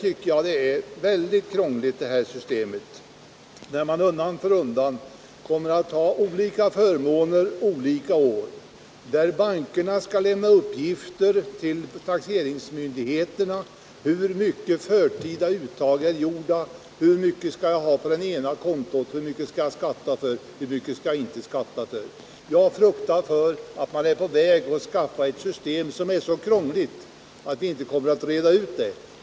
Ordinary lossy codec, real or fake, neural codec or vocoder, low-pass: none; real; none; none